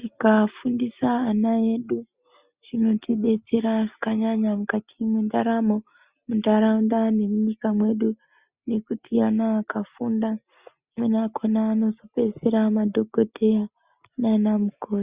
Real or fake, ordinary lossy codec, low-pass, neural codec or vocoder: real; Opus, 64 kbps; 3.6 kHz; none